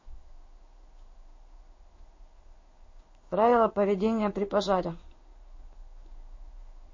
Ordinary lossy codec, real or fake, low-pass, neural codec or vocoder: MP3, 32 kbps; fake; 7.2 kHz; codec, 16 kHz in and 24 kHz out, 1 kbps, XY-Tokenizer